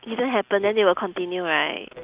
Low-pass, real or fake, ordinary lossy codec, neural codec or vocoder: 3.6 kHz; real; Opus, 32 kbps; none